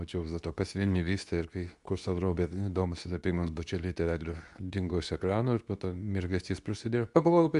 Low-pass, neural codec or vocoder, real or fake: 10.8 kHz; codec, 24 kHz, 0.9 kbps, WavTokenizer, medium speech release version 2; fake